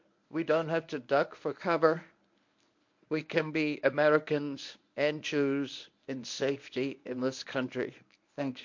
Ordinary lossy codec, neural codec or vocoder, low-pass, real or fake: MP3, 48 kbps; codec, 24 kHz, 0.9 kbps, WavTokenizer, medium speech release version 1; 7.2 kHz; fake